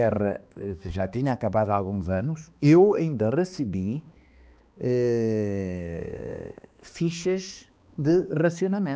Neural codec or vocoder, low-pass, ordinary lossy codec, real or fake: codec, 16 kHz, 2 kbps, X-Codec, HuBERT features, trained on balanced general audio; none; none; fake